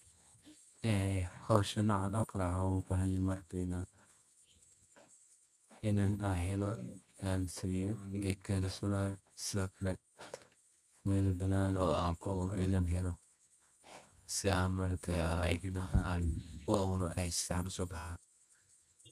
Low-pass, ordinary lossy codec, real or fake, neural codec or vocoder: none; none; fake; codec, 24 kHz, 0.9 kbps, WavTokenizer, medium music audio release